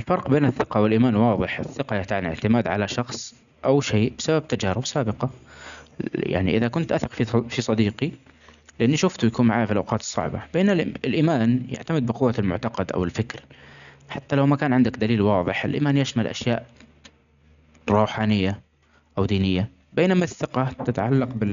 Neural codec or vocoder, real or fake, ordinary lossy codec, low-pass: none; real; none; 7.2 kHz